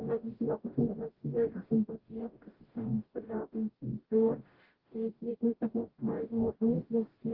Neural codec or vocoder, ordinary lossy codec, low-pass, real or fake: codec, 44.1 kHz, 0.9 kbps, DAC; Opus, 16 kbps; 5.4 kHz; fake